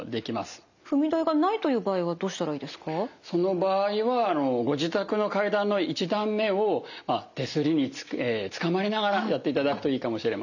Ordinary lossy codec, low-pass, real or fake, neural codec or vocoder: none; 7.2 kHz; real; none